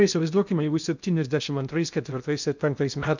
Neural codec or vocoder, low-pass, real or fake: codec, 16 kHz in and 24 kHz out, 0.6 kbps, FocalCodec, streaming, 2048 codes; 7.2 kHz; fake